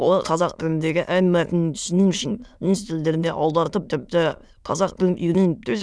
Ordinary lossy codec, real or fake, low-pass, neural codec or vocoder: none; fake; none; autoencoder, 22.05 kHz, a latent of 192 numbers a frame, VITS, trained on many speakers